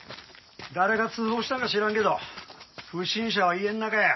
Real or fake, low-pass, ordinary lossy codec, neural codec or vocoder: real; 7.2 kHz; MP3, 24 kbps; none